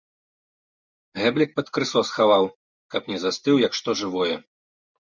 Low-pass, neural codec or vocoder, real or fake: 7.2 kHz; none; real